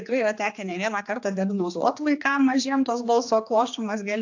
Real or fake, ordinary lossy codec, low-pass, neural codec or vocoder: fake; AAC, 48 kbps; 7.2 kHz; codec, 16 kHz, 2 kbps, X-Codec, HuBERT features, trained on general audio